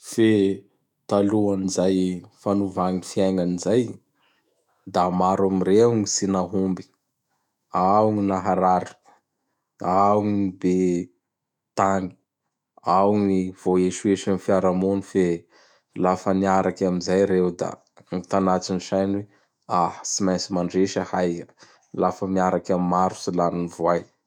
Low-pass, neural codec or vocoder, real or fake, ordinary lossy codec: 19.8 kHz; autoencoder, 48 kHz, 128 numbers a frame, DAC-VAE, trained on Japanese speech; fake; none